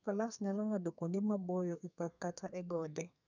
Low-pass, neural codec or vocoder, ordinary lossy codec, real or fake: 7.2 kHz; codec, 32 kHz, 1.9 kbps, SNAC; none; fake